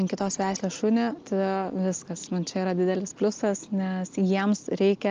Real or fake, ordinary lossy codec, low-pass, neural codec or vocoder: real; Opus, 24 kbps; 7.2 kHz; none